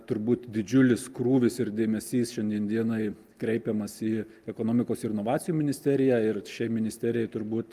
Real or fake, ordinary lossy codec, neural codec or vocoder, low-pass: real; Opus, 32 kbps; none; 14.4 kHz